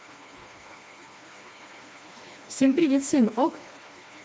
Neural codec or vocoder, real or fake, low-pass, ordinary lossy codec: codec, 16 kHz, 2 kbps, FreqCodec, smaller model; fake; none; none